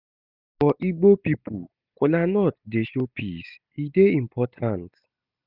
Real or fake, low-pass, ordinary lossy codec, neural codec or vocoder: real; 5.4 kHz; none; none